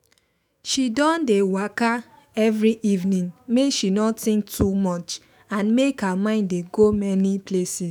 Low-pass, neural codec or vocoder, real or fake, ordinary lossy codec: none; autoencoder, 48 kHz, 128 numbers a frame, DAC-VAE, trained on Japanese speech; fake; none